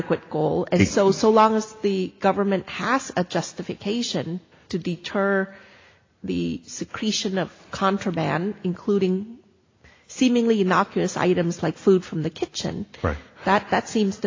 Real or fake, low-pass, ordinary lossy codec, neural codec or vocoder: real; 7.2 kHz; AAC, 32 kbps; none